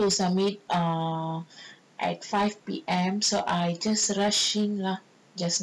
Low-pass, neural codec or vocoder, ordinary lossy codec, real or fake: none; none; none; real